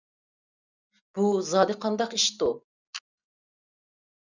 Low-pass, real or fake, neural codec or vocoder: 7.2 kHz; real; none